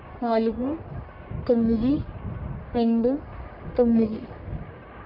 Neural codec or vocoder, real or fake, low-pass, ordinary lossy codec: codec, 44.1 kHz, 1.7 kbps, Pupu-Codec; fake; 5.4 kHz; none